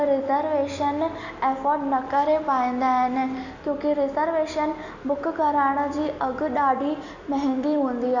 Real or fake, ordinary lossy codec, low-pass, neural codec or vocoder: real; none; 7.2 kHz; none